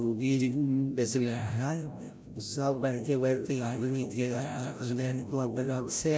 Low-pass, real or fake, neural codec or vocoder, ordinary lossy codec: none; fake; codec, 16 kHz, 0.5 kbps, FreqCodec, larger model; none